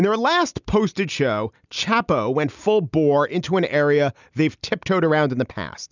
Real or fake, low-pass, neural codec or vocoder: real; 7.2 kHz; none